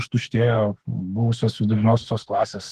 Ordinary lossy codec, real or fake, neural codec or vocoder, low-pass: Opus, 16 kbps; fake; autoencoder, 48 kHz, 32 numbers a frame, DAC-VAE, trained on Japanese speech; 14.4 kHz